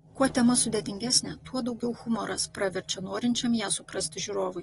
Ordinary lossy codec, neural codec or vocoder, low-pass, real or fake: AAC, 32 kbps; none; 10.8 kHz; real